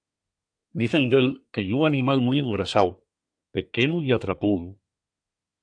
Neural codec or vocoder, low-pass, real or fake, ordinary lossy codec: codec, 24 kHz, 1 kbps, SNAC; 9.9 kHz; fake; AAC, 64 kbps